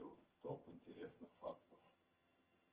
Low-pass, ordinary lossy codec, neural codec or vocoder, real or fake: 3.6 kHz; Opus, 16 kbps; vocoder, 22.05 kHz, 80 mel bands, HiFi-GAN; fake